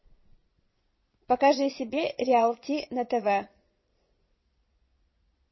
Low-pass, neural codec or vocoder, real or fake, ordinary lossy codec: 7.2 kHz; vocoder, 22.05 kHz, 80 mel bands, Vocos; fake; MP3, 24 kbps